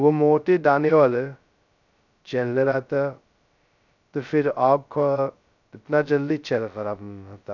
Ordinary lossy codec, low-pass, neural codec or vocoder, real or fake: none; 7.2 kHz; codec, 16 kHz, 0.2 kbps, FocalCodec; fake